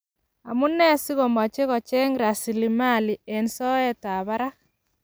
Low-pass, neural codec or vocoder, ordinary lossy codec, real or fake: none; none; none; real